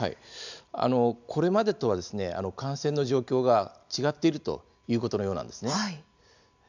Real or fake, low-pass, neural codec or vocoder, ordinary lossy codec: real; 7.2 kHz; none; none